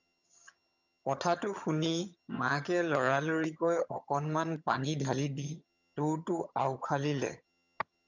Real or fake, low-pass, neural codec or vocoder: fake; 7.2 kHz; vocoder, 22.05 kHz, 80 mel bands, HiFi-GAN